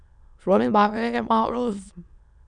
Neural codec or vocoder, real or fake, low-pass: autoencoder, 22.05 kHz, a latent of 192 numbers a frame, VITS, trained on many speakers; fake; 9.9 kHz